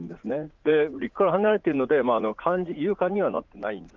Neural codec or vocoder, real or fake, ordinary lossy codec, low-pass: vocoder, 44.1 kHz, 80 mel bands, Vocos; fake; Opus, 32 kbps; 7.2 kHz